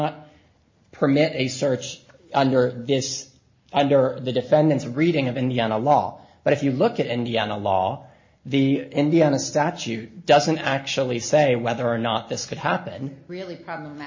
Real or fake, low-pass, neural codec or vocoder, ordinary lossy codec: real; 7.2 kHz; none; MP3, 32 kbps